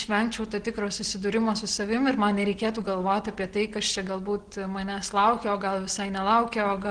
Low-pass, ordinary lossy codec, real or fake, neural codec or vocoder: 9.9 kHz; Opus, 16 kbps; real; none